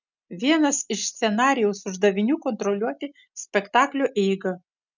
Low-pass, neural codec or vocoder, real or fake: 7.2 kHz; none; real